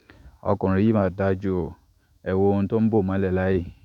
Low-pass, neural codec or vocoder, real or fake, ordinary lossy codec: 19.8 kHz; autoencoder, 48 kHz, 128 numbers a frame, DAC-VAE, trained on Japanese speech; fake; none